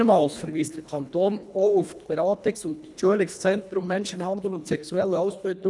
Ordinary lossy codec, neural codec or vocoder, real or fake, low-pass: none; codec, 24 kHz, 1.5 kbps, HILCodec; fake; none